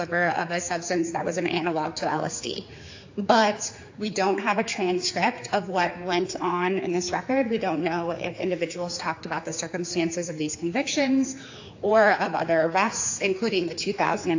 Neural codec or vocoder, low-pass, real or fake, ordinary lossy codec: codec, 16 kHz, 4 kbps, X-Codec, HuBERT features, trained on general audio; 7.2 kHz; fake; AAC, 48 kbps